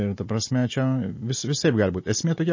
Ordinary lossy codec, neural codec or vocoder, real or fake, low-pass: MP3, 32 kbps; none; real; 7.2 kHz